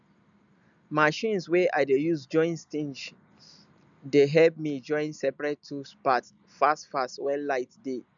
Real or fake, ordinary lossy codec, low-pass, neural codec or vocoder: real; none; 7.2 kHz; none